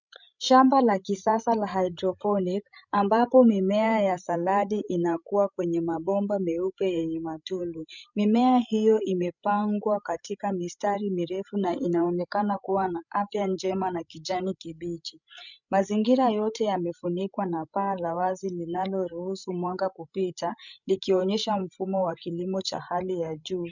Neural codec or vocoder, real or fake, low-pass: codec, 16 kHz, 16 kbps, FreqCodec, larger model; fake; 7.2 kHz